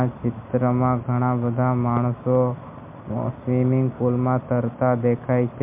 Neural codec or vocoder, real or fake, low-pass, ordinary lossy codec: none; real; 3.6 kHz; MP3, 32 kbps